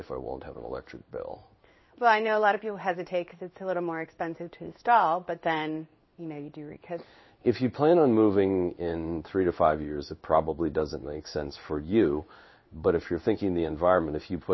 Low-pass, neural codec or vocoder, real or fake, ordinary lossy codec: 7.2 kHz; codec, 16 kHz in and 24 kHz out, 1 kbps, XY-Tokenizer; fake; MP3, 24 kbps